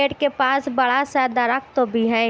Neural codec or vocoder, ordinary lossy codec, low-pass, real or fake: none; none; none; real